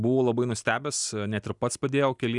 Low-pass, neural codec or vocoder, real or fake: 10.8 kHz; none; real